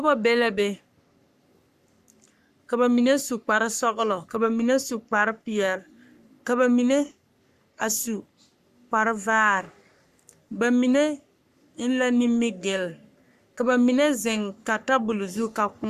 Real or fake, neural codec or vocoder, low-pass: fake; codec, 44.1 kHz, 3.4 kbps, Pupu-Codec; 14.4 kHz